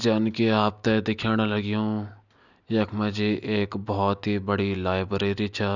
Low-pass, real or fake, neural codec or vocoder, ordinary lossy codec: 7.2 kHz; real; none; none